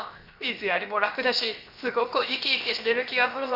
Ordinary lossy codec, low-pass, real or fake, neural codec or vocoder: none; 5.4 kHz; fake; codec, 16 kHz, 0.7 kbps, FocalCodec